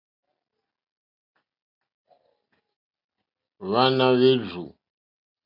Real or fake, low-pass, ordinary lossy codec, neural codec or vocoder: real; 5.4 kHz; MP3, 48 kbps; none